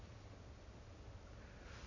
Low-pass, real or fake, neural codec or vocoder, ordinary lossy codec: 7.2 kHz; real; none; none